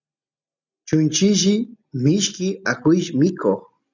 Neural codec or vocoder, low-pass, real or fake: none; 7.2 kHz; real